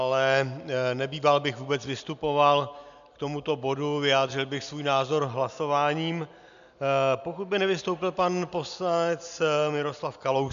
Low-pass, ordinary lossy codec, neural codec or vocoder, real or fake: 7.2 kHz; MP3, 96 kbps; none; real